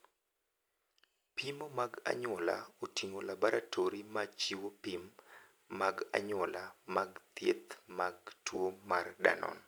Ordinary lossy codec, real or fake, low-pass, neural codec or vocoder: none; real; none; none